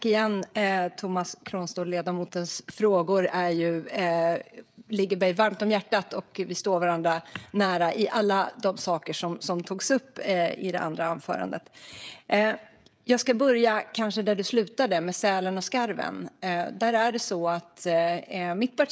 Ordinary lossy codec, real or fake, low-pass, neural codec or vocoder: none; fake; none; codec, 16 kHz, 16 kbps, FreqCodec, smaller model